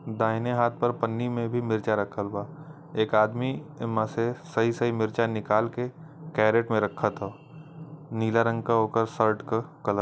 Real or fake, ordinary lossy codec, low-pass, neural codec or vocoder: real; none; none; none